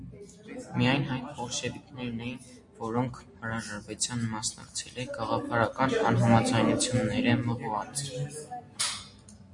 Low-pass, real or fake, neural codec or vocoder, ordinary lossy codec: 10.8 kHz; real; none; MP3, 48 kbps